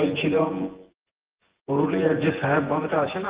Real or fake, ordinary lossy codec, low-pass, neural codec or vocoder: fake; Opus, 16 kbps; 3.6 kHz; vocoder, 24 kHz, 100 mel bands, Vocos